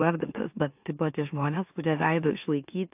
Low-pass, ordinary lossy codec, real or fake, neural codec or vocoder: 3.6 kHz; AAC, 32 kbps; fake; autoencoder, 44.1 kHz, a latent of 192 numbers a frame, MeloTTS